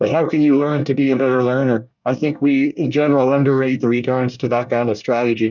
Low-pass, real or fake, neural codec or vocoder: 7.2 kHz; fake; codec, 24 kHz, 1 kbps, SNAC